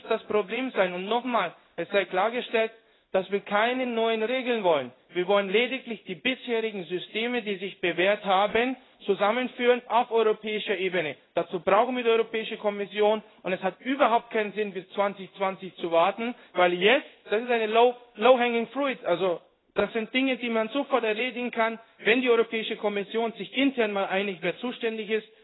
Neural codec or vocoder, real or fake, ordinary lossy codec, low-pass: codec, 16 kHz in and 24 kHz out, 1 kbps, XY-Tokenizer; fake; AAC, 16 kbps; 7.2 kHz